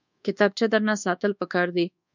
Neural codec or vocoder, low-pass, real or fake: codec, 24 kHz, 1.2 kbps, DualCodec; 7.2 kHz; fake